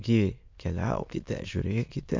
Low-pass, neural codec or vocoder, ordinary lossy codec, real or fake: 7.2 kHz; autoencoder, 22.05 kHz, a latent of 192 numbers a frame, VITS, trained on many speakers; MP3, 64 kbps; fake